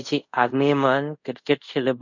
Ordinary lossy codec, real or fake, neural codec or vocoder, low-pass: none; fake; codec, 24 kHz, 0.5 kbps, DualCodec; 7.2 kHz